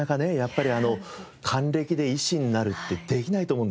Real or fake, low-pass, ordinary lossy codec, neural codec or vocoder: real; none; none; none